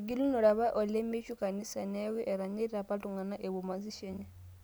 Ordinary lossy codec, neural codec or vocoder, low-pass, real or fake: none; none; none; real